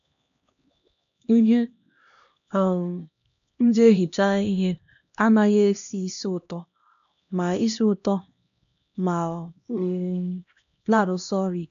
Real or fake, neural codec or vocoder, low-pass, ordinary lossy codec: fake; codec, 16 kHz, 1 kbps, X-Codec, HuBERT features, trained on LibriSpeech; 7.2 kHz; none